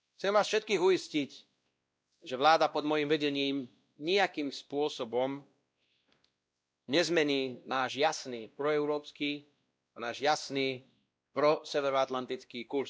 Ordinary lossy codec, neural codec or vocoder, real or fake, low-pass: none; codec, 16 kHz, 1 kbps, X-Codec, WavLM features, trained on Multilingual LibriSpeech; fake; none